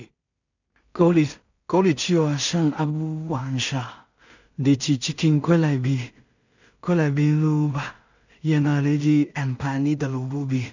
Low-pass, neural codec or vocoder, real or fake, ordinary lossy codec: 7.2 kHz; codec, 16 kHz in and 24 kHz out, 0.4 kbps, LongCat-Audio-Codec, two codebook decoder; fake; none